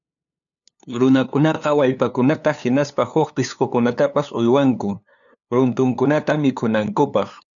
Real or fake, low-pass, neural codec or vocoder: fake; 7.2 kHz; codec, 16 kHz, 2 kbps, FunCodec, trained on LibriTTS, 25 frames a second